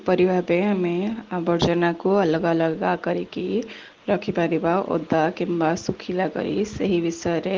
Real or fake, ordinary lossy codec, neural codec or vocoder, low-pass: real; Opus, 16 kbps; none; 7.2 kHz